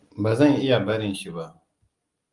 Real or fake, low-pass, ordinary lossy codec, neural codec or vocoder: real; 10.8 kHz; Opus, 32 kbps; none